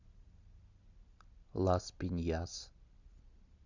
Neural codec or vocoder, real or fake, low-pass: none; real; 7.2 kHz